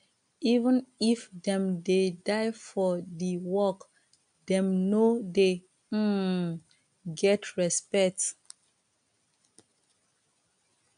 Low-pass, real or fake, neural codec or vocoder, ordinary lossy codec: 9.9 kHz; real; none; AAC, 96 kbps